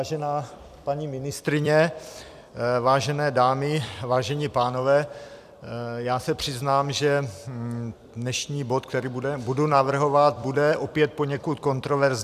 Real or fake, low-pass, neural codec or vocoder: fake; 14.4 kHz; vocoder, 44.1 kHz, 128 mel bands every 256 samples, BigVGAN v2